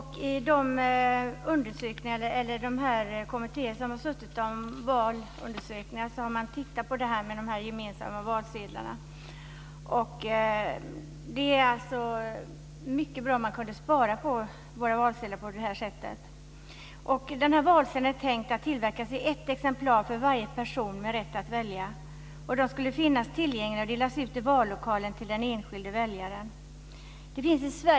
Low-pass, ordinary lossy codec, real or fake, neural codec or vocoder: none; none; real; none